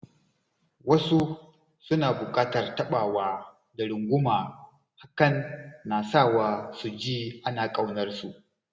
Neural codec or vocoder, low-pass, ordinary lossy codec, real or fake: none; none; none; real